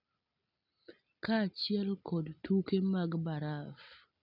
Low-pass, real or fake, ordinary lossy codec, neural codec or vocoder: 5.4 kHz; real; none; none